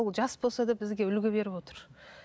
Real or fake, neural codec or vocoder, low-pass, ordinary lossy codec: real; none; none; none